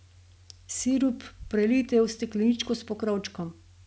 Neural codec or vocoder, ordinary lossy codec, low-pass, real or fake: none; none; none; real